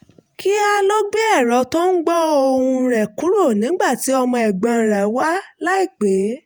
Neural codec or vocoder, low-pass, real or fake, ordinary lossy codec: vocoder, 48 kHz, 128 mel bands, Vocos; none; fake; none